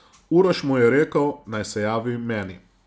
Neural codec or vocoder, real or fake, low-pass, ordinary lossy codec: none; real; none; none